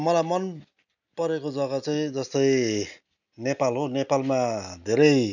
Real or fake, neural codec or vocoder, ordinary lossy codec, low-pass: real; none; none; 7.2 kHz